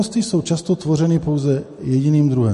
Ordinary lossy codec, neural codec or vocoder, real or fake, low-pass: MP3, 48 kbps; none; real; 14.4 kHz